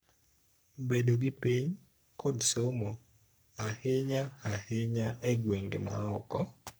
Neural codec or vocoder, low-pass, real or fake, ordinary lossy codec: codec, 44.1 kHz, 3.4 kbps, Pupu-Codec; none; fake; none